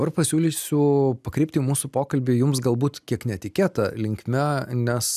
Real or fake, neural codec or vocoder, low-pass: real; none; 14.4 kHz